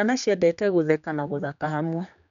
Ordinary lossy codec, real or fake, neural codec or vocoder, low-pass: none; fake; codec, 16 kHz, 2 kbps, X-Codec, HuBERT features, trained on general audio; 7.2 kHz